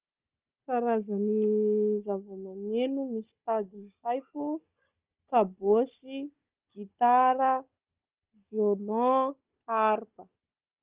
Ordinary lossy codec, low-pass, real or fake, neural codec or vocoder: Opus, 24 kbps; 3.6 kHz; fake; codec, 16 kHz, 16 kbps, FunCodec, trained on Chinese and English, 50 frames a second